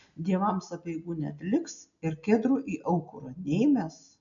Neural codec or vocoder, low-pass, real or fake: none; 7.2 kHz; real